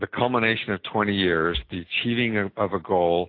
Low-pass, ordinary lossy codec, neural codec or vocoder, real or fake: 5.4 kHz; AAC, 32 kbps; none; real